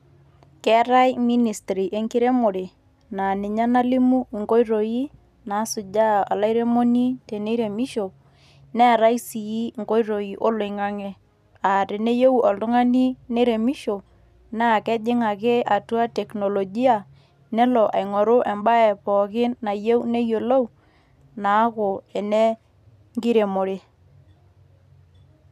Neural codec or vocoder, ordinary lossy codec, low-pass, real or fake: none; none; 14.4 kHz; real